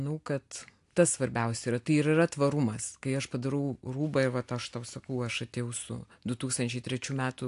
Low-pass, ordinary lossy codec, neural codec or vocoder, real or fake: 10.8 kHz; AAC, 64 kbps; none; real